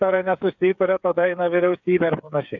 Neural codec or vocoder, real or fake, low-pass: codec, 16 kHz, 8 kbps, FreqCodec, smaller model; fake; 7.2 kHz